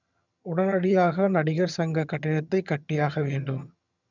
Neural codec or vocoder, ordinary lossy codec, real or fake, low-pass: vocoder, 22.05 kHz, 80 mel bands, HiFi-GAN; none; fake; 7.2 kHz